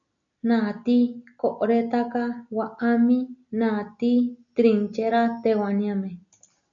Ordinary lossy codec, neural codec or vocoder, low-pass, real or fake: MP3, 64 kbps; none; 7.2 kHz; real